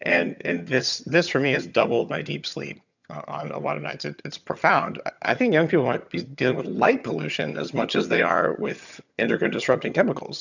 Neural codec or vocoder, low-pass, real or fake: vocoder, 22.05 kHz, 80 mel bands, HiFi-GAN; 7.2 kHz; fake